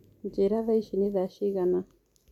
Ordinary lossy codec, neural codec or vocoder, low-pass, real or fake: MP3, 96 kbps; vocoder, 44.1 kHz, 128 mel bands every 512 samples, BigVGAN v2; 19.8 kHz; fake